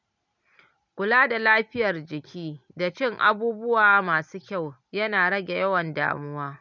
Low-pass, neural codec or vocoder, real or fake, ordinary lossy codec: 7.2 kHz; none; real; none